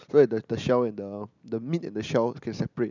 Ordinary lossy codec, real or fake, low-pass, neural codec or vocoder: none; real; 7.2 kHz; none